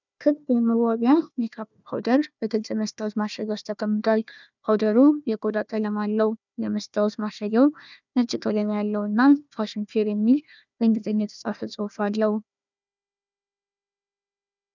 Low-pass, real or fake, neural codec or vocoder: 7.2 kHz; fake; codec, 16 kHz, 1 kbps, FunCodec, trained on Chinese and English, 50 frames a second